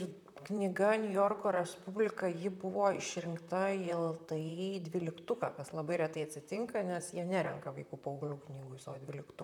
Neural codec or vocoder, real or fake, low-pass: vocoder, 44.1 kHz, 128 mel bands, Pupu-Vocoder; fake; 19.8 kHz